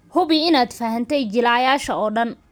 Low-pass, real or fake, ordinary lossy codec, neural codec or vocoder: none; real; none; none